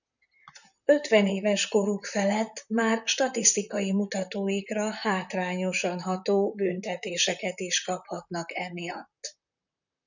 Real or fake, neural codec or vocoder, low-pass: fake; vocoder, 44.1 kHz, 128 mel bands, Pupu-Vocoder; 7.2 kHz